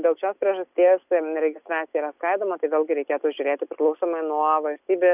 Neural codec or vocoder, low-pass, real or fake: none; 3.6 kHz; real